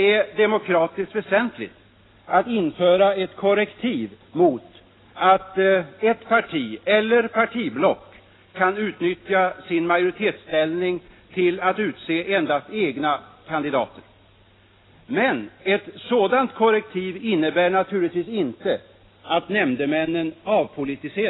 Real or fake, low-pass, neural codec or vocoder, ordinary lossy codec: real; 7.2 kHz; none; AAC, 16 kbps